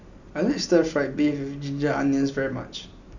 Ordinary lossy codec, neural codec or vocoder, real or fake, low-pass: none; none; real; 7.2 kHz